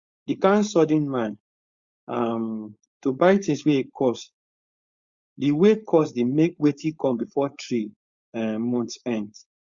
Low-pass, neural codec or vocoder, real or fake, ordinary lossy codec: 7.2 kHz; codec, 16 kHz, 4.8 kbps, FACodec; fake; Opus, 64 kbps